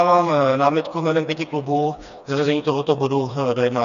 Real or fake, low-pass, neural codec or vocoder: fake; 7.2 kHz; codec, 16 kHz, 2 kbps, FreqCodec, smaller model